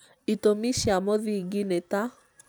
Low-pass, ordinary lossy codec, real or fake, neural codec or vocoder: none; none; real; none